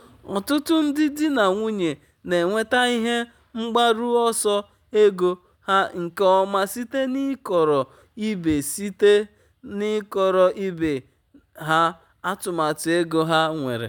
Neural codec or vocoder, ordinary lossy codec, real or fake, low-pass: none; none; real; none